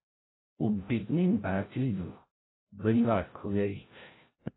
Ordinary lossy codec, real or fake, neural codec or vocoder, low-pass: AAC, 16 kbps; fake; codec, 16 kHz, 0.5 kbps, FreqCodec, larger model; 7.2 kHz